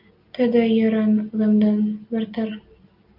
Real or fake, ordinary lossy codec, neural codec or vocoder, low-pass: real; Opus, 24 kbps; none; 5.4 kHz